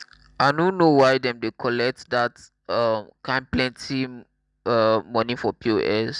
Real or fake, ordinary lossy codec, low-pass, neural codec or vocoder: real; none; 10.8 kHz; none